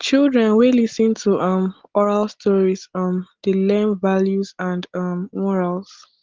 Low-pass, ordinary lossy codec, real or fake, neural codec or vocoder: 7.2 kHz; Opus, 16 kbps; real; none